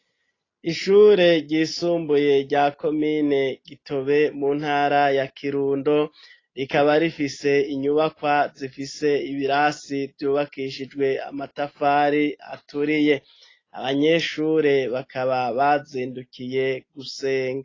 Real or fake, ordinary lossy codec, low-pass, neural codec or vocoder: real; AAC, 32 kbps; 7.2 kHz; none